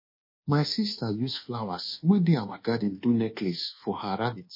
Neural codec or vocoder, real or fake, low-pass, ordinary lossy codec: codec, 24 kHz, 1.2 kbps, DualCodec; fake; 5.4 kHz; MP3, 24 kbps